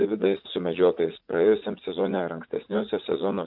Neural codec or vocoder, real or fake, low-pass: vocoder, 44.1 kHz, 80 mel bands, Vocos; fake; 5.4 kHz